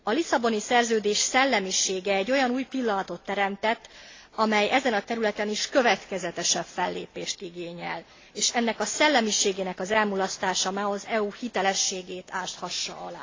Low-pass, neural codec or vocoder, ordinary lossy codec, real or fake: 7.2 kHz; none; AAC, 32 kbps; real